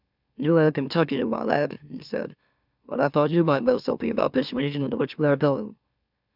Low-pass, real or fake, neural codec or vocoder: 5.4 kHz; fake; autoencoder, 44.1 kHz, a latent of 192 numbers a frame, MeloTTS